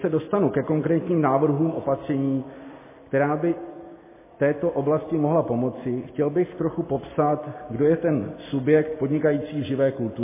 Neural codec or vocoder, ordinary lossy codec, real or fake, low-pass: none; MP3, 16 kbps; real; 3.6 kHz